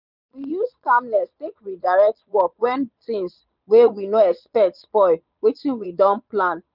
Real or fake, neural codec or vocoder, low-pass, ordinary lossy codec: fake; vocoder, 22.05 kHz, 80 mel bands, Vocos; 5.4 kHz; none